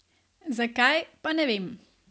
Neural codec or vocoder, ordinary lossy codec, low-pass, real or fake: none; none; none; real